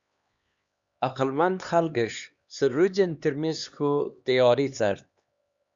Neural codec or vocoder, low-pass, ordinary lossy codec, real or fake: codec, 16 kHz, 2 kbps, X-Codec, HuBERT features, trained on LibriSpeech; 7.2 kHz; Opus, 64 kbps; fake